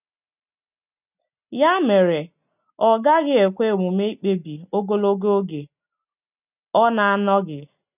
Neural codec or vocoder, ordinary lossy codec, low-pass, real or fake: none; none; 3.6 kHz; real